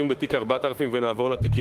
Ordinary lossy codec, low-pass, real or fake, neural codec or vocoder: Opus, 24 kbps; 14.4 kHz; fake; autoencoder, 48 kHz, 32 numbers a frame, DAC-VAE, trained on Japanese speech